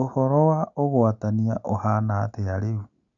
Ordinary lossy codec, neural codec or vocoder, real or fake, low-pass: none; none; real; 7.2 kHz